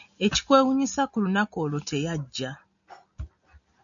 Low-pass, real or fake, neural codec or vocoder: 7.2 kHz; real; none